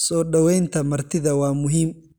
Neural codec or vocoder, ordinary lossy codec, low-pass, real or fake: none; none; none; real